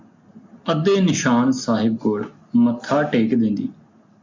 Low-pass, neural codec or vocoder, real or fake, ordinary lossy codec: 7.2 kHz; none; real; MP3, 64 kbps